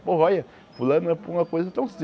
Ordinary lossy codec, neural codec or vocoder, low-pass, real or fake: none; none; none; real